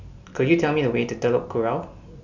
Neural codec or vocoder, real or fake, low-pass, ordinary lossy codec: none; real; 7.2 kHz; none